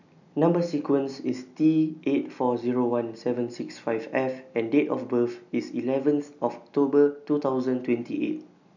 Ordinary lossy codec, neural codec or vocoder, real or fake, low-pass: none; none; real; 7.2 kHz